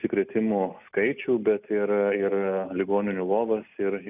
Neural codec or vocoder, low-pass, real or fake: none; 3.6 kHz; real